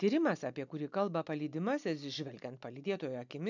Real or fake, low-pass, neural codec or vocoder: real; 7.2 kHz; none